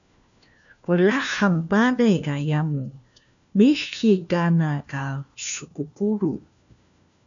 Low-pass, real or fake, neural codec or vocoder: 7.2 kHz; fake; codec, 16 kHz, 1 kbps, FunCodec, trained on LibriTTS, 50 frames a second